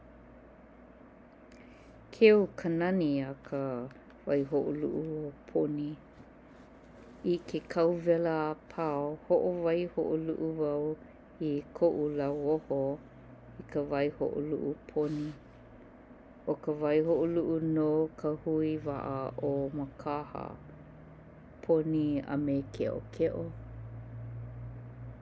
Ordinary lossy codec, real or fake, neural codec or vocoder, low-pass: none; real; none; none